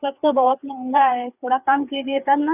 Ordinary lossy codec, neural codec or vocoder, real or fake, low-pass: none; codec, 16 kHz, 4 kbps, FreqCodec, larger model; fake; 3.6 kHz